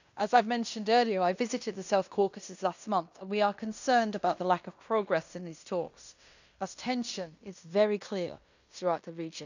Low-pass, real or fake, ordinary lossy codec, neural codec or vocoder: 7.2 kHz; fake; none; codec, 16 kHz in and 24 kHz out, 0.9 kbps, LongCat-Audio-Codec, fine tuned four codebook decoder